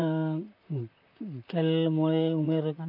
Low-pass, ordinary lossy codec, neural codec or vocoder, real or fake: 5.4 kHz; AAC, 48 kbps; vocoder, 44.1 kHz, 128 mel bands, Pupu-Vocoder; fake